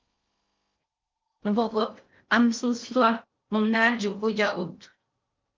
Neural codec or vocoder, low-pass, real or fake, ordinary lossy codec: codec, 16 kHz in and 24 kHz out, 0.6 kbps, FocalCodec, streaming, 4096 codes; 7.2 kHz; fake; Opus, 32 kbps